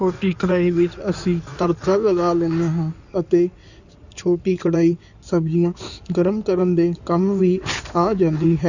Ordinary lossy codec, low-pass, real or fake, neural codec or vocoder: none; 7.2 kHz; fake; codec, 16 kHz in and 24 kHz out, 2.2 kbps, FireRedTTS-2 codec